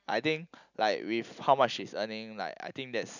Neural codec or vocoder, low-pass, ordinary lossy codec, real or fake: none; 7.2 kHz; none; real